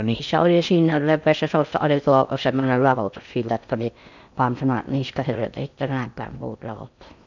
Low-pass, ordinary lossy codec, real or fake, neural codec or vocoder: 7.2 kHz; none; fake; codec, 16 kHz in and 24 kHz out, 0.6 kbps, FocalCodec, streaming, 2048 codes